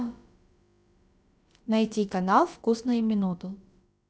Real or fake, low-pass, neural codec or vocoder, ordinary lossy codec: fake; none; codec, 16 kHz, about 1 kbps, DyCAST, with the encoder's durations; none